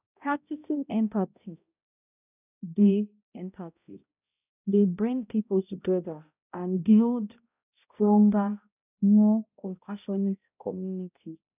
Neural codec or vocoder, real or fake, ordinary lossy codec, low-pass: codec, 16 kHz, 0.5 kbps, X-Codec, HuBERT features, trained on balanced general audio; fake; none; 3.6 kHz